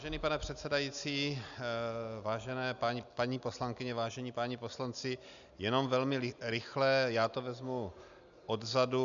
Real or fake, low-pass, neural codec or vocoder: real; 7.2 kHz; none